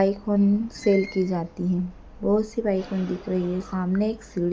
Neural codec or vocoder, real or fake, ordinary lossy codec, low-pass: none; real; none; none